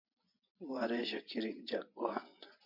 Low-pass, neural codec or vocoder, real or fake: 5.4 kHz; vocoder, 24 kHz, 100 mel bands, Vocos; fake